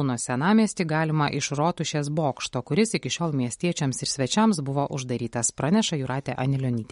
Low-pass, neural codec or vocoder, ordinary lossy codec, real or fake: 10.8 kHz; none; MP3, 48 kbps; real